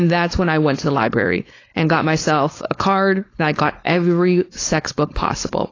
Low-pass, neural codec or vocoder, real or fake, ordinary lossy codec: 7.2 kHz; codec, 16 kHz, 4.8 kbps, FACodec; fake; AAC, 32 kbps